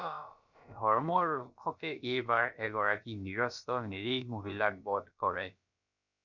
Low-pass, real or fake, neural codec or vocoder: 7.2 kHz; fake; codec, 16 kHz, about 1 kbps, DyCAST, with the encoder's durations